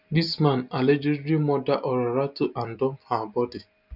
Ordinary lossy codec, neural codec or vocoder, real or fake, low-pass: none; none; real; 5.4 kHz